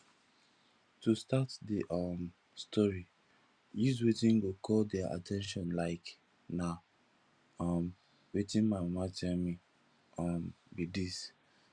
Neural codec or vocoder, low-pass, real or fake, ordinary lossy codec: none; 9.9 kHz; real; Opus, 64 kbps